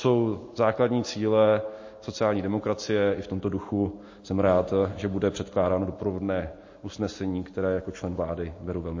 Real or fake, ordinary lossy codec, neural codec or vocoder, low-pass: real; MP3, 32 kbps; none; 7.2 kHz